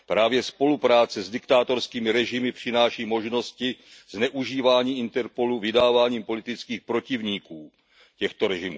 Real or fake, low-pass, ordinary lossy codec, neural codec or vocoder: real; none; none; none